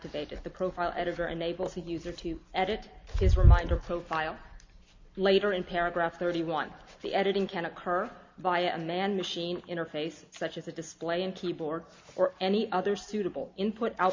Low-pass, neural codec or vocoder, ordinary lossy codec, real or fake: 7.2 kHz; none; MP3, 48 kbps; real